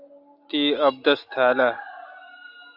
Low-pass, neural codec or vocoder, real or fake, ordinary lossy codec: 5.4 kHz; none; real; AAC, 48 kbps